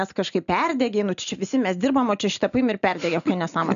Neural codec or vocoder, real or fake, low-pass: none; real; 7.2 kHz